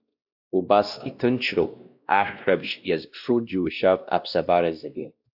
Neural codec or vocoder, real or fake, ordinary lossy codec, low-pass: codec, 16 kHz, 1 kbps, X-Codec, WavLM features, trained on Multilingual LibriSpeech; fake; AAC, 48 kbps; 5.4 kHz